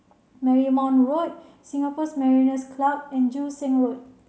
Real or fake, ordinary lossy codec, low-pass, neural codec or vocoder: real; none; none; none